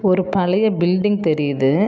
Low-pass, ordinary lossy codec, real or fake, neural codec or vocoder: none; none; real; none